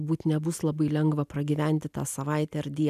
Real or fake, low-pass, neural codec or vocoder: real; 14.4 kHz; none